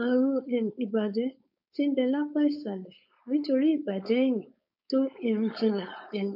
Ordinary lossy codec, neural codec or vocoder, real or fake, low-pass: none; codec, 16 kHz, 4.8 kbps, FACodec; fake; 5.4 kHz